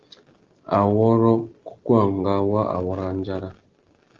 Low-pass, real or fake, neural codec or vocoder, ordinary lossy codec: 7.2 kHz; real; none; Opus, 16 kbps